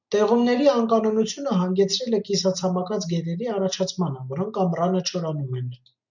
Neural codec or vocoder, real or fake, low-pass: none; real; 7.2 kHz